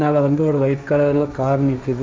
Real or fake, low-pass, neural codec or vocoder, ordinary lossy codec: fake; none; codec, 16 kHz, 1.1 kbps, Voila-Tokenizer; none